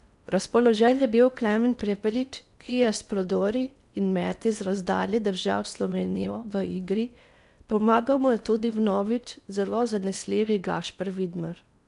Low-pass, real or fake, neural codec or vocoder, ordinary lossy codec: 10.8 kHz; fake; codec, 16 kHz in and 24 kHz out, 0.8 kbps, FocalCodec, streaming, 65536 codes; none